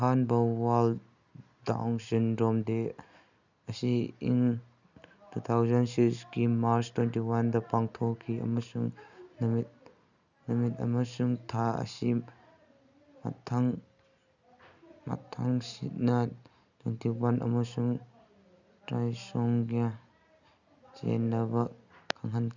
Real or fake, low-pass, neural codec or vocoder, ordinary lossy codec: real; 7.2 kHz; none; none